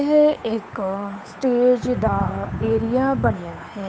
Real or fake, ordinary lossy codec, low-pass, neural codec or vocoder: fake; none; none; codec, 16 kHz, 8 kbps, FunCodec, trained on Chinese and English, 25 frames a second